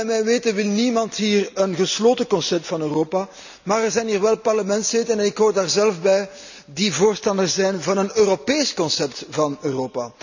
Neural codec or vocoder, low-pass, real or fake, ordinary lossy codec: none; 7.2 kHz; real; none